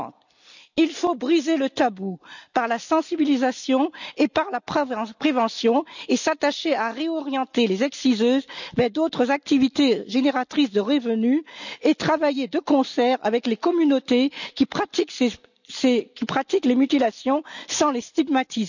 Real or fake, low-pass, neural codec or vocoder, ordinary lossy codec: real; 7.2 kHz; none; none